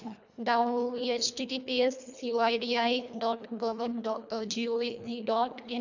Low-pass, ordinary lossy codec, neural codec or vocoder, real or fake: 7.2 kHz; none; codec, 24 kHz, 1.5 kbps, HILCodec; fake